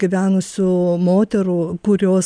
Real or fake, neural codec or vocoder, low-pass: real; none; 9.9 kHz